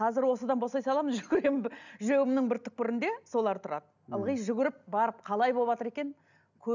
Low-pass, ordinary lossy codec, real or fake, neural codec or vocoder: 7.2 kHz; none; real; none